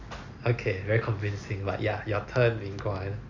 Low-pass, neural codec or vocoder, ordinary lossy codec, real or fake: 7.2 kHz; none; Opus, 64 kbps; real